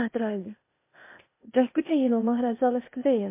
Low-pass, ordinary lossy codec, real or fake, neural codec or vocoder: 3.6 kHz; MP3, 24 kbps; fake; codec, 16 kHz, 0.8 kbps, ZipCodec